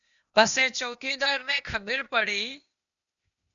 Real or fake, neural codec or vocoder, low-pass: fake; codec, 16 kHz, 0.8 kbps, ZipCodec; 7.2 kHz